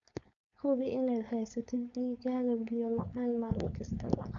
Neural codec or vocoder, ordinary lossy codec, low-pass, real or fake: codec, 16 kHz, 4.8 kbps, FACodec; none; 7.2 kHz; fake